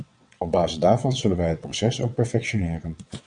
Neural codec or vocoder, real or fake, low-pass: vocoder, 22.05 kHz, 80 mel bands, WaveNeXt; fake; 9.9 kHz